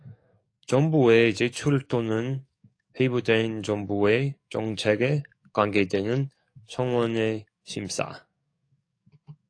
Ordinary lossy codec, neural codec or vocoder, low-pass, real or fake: AAC, 48 kbps; codec, 44.1 kHz, 7.8 kbps, DAC; 9.9 kHz; fake